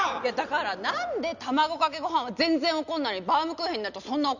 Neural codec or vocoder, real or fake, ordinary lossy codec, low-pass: none; real; none; 7.2 kHz